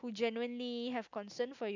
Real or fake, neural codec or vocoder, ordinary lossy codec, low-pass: real; none; none; 7.2 kHz